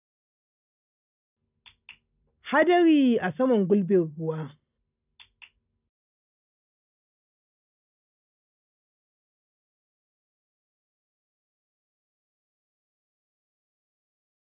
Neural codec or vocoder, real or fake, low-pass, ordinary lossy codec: none; real; 3.6 kHz; none